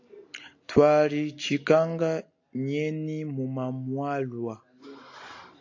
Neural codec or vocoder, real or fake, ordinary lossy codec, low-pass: none; real; AAC, 48 kbps; 7.2 kHz